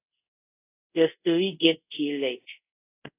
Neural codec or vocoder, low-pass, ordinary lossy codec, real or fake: codec, 24 kHz, 0.5 kbps, DualCodec; 3.6 kHz; AAC, 24 kbps; fake